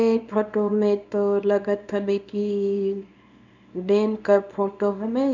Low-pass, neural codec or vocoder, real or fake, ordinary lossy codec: 7.2 kHz; codec, 24 kHz, 0.9 kbps, WavTokenizer, small release; fake; none